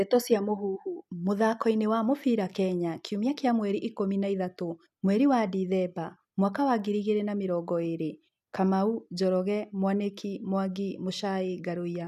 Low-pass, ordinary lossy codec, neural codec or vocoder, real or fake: 14.4 kHz; none; none; real